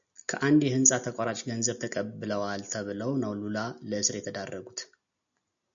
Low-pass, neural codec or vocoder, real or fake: 7.2 kHz; none; real